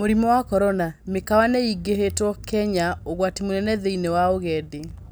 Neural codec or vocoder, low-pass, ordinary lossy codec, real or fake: none; none; none; real